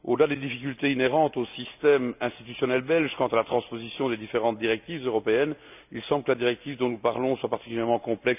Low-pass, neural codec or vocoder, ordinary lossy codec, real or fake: 3.6 kHz; none; none; real